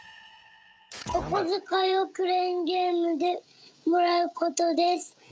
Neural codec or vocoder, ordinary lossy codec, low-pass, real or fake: codec, 16 kHz, 16 kbps, FreqCodec, smaller model; none; none; fake